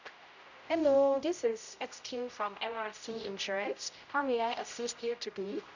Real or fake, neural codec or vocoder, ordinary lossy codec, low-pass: fake; codec, 16 kHz, 0.5 kbps, X-Codec, HuBERT features, trained on general audio; none; 7.2 kHz